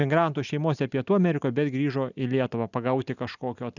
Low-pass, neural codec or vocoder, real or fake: 7.2 kHz; none; real